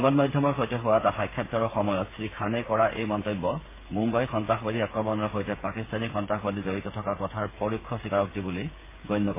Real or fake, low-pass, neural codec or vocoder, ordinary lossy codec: fake; 3.6 kHz; codec, 16 kHz, 8 kbps, FreqCodec, smaller model; MP3, 16 kbps